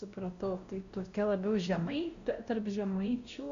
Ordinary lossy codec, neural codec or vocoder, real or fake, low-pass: Opus, 64 kbps; codec, 16 kHz, 1 kbps, X-Codec, WavLM features, trained on Multilingual LibriSpeech; fake; 7.2 kHz